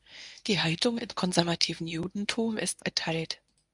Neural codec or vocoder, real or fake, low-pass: codec, 24 kHz, 0.9 kbps, WavTokenizer, medium speech release version 1; fake; 10.8 kHz